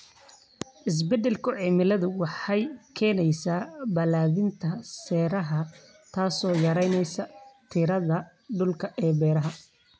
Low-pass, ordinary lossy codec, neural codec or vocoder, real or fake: none; none; none; real